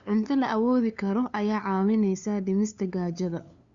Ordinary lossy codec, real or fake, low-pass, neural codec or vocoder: Opus, 64 kbps; fake; 7.2 kHz; codec, 16 kHz, 2 kbps, FunCodec, trained on LibriTTS, 25 frames a second